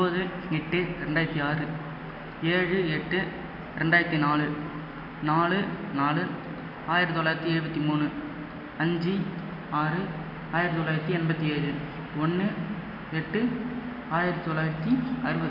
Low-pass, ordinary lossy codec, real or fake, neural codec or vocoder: 5.4 kHz; none; real; none